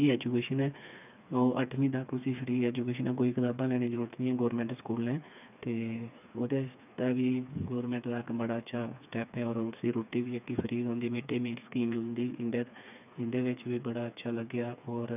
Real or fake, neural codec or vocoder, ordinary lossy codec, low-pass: fake; codec, 16 kHz, 4 kbps, FreqCodec, smaller model; none; 3.6 kHz